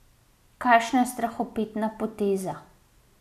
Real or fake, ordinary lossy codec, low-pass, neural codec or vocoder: real; none; 14.4 kHz; none